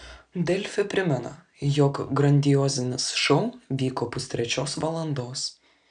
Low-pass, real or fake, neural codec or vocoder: 9.9 kHz; real; none